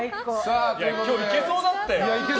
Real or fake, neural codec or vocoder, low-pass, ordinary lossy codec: real; none; none; none